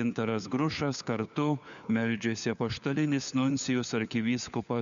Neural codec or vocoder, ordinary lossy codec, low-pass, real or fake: codec, 16 kHz, 4 kbps, FunCodec, trained on LibriTTS, 50 frames a second; MP3, 96 kbps; 7.2 kHz; fake